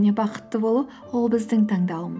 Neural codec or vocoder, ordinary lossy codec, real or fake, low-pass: none; none; real; none